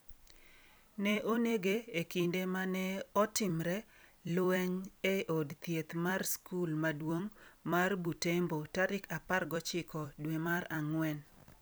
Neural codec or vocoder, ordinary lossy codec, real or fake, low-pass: vocoder, 44.1 kHz, 128 mel bands every 256 samples, BigVGAN v2; none; fake; none